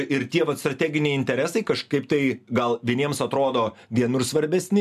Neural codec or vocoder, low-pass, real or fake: none; 14.4 kHz; real